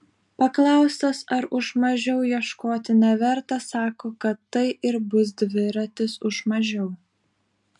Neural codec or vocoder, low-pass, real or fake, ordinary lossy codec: none; 10.8 kHz; real; MP3, 64 kbps